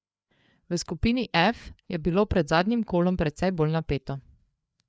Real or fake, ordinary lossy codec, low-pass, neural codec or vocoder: fake; none; none; codec, 16 kHz, 4 kbps, FreqCodec, larger model